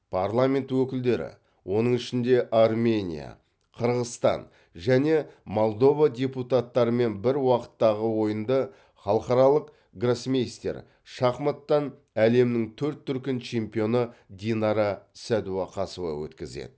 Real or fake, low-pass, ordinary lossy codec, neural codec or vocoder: real; none; none; none